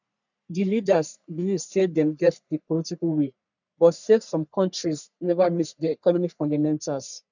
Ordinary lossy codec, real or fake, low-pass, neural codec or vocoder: none; fake; 7.2 kHz; codec, 44.1 kHz, 3.4 kbps, Pupu-Codec